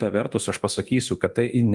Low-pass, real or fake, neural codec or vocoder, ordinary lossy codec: 10.8 kHz; fake; codec, 24 kHz, 0.9 kbps, DualCodec; Opus, 24 kbps